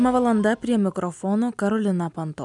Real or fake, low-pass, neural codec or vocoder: real; 10.8 kHz; none